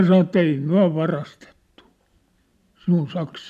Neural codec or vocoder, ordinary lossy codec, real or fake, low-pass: none; none; real; 14.4 kHz